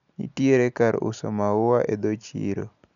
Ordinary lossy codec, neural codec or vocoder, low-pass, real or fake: none; none; 7.2 kHz; real